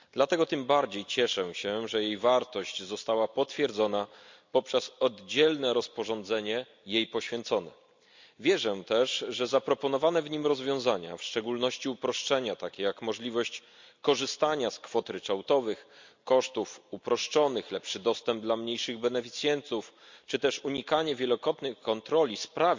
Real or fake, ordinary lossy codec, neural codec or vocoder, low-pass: real; MP3, 64 kbps; none; 7.2 kHz